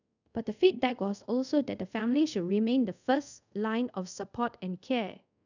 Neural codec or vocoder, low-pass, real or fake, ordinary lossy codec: codec, 24 kHz, 0.5 kbps, DualCodec; 7.2 kHz; fake; none